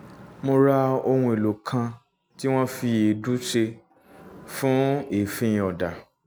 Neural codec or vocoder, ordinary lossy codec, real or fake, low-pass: none; none; real; none